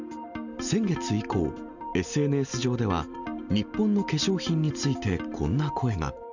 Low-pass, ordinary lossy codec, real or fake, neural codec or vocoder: 7.2 kHz; none; real; none